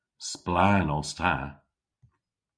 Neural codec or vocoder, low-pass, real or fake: none; 9.9 kHz; real